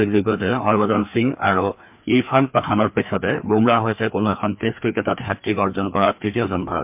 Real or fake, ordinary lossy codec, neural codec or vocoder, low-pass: fake; MP3, 32 kbps; codec, 16 kHz, 2 kbps, FreqCodec, larger model; 3.6 kHz